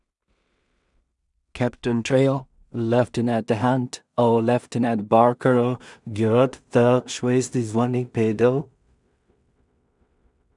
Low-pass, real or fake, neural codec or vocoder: 10.8 kHz; fake; codec, 16 kHz in and 24 kHz out, 0.4 kbps, LongCat-Audio-Codec, two codebook decoder